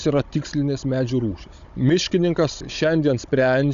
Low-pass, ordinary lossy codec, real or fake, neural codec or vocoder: 7.2 kHz; Opus, 64 kbps; fake; codec, 16 kHz, 16 kbps, FunCodec, trained on Chinese and English, 50 frames a second